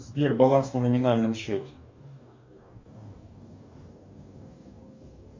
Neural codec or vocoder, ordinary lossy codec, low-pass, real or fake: codec, 44.1 kHz, 2.6 kbps, DAC; MP3, 64 kbps; 7.2 kHz; fake